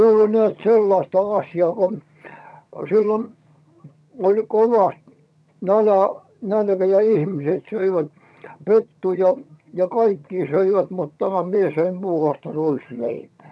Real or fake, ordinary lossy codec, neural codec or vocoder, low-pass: fake; none; vocoder, 22.05 kHz, 80 mel bands, HiFi-GAN; none